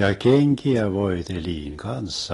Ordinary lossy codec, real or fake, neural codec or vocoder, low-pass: AAC, 32 kbps; real; none; 10.8 kHz